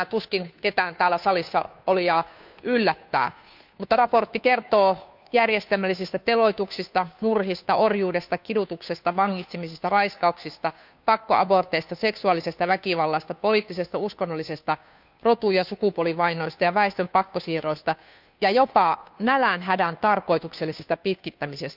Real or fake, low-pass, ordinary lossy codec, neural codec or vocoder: fake; 5.4 kHz; none; codec, 16 kHz, 2 kbps, FunCodec, trained on Chinese and English, 25 frames a second